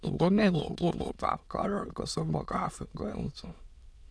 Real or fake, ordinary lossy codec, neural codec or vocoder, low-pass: fake; none; autoencoder, 22.05 kHz, a latent of 192 numbers a frame, VITS, trained on many speakers; none